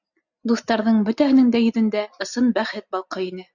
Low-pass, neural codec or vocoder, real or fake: 7.2 kHz; none; real